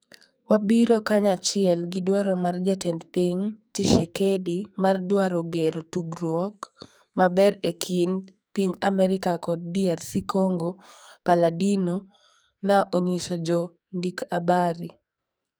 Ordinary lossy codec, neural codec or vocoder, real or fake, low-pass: none; codec, 44.1 kHz, 2.6 kbps, SNAC; fake; none